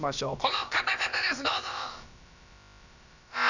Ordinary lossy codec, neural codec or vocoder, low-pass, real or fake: none; codec, 16 kHz, about 1 kbps, DyCAST, with the encoder's durations; 7.2 kHz; fake